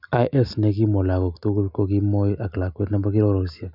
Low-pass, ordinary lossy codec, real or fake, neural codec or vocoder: 5.4 kHz; none; real; none